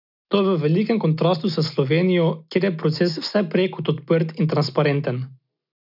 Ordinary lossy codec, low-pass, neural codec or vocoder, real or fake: none; 5.4 kHz; none; real